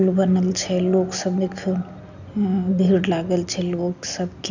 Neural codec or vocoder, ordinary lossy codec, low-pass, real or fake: none; none; 7.2 kHz; real